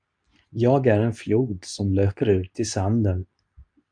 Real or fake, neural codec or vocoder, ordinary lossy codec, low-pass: fake; codec, 24 kHz, 0.9 kbps, WavTokenizer, medium speech release version 2; MP3, 96 kbps; 9.9 kHz